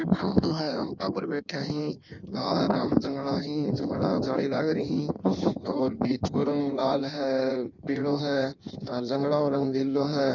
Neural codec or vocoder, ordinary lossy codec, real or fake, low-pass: codec, 16 kHz in and 24 kHz out, 1.1 kbps, FireRedTTS-2 codec; none; fake; 7.2 kHz